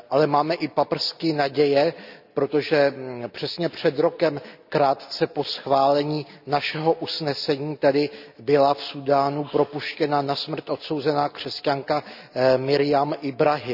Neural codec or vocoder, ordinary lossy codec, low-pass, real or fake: none; none; 5.4 kHz; real